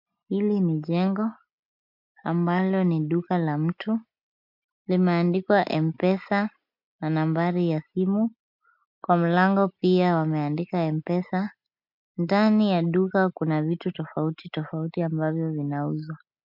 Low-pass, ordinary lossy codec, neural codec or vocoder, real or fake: 5.4 kHz; MP3, 48 kbps; none; real